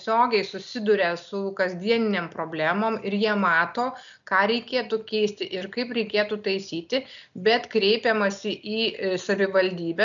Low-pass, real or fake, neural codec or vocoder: 7.2 kHz; real; none